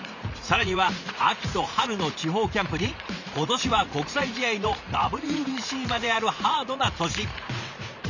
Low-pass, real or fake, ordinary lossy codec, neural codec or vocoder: 7.2 kHz; fake; none; vocoder, 44.1 kHz, 80 mel bands, Vocos